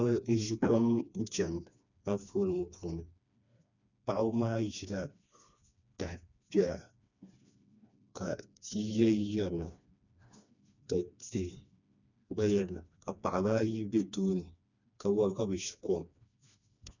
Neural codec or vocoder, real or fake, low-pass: codec, 16 kHz, 2 kbps, FreqCodec, smaller model; fake; 7.2 kHz